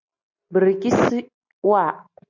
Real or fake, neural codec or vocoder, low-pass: real; none; 7.2 kHz